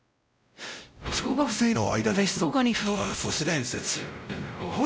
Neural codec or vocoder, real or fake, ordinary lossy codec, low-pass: codec, 16 kHz, 0.5 kbps, X-Codec, WavLM features, trained on Multilingual LibriSpeech; fake; none; none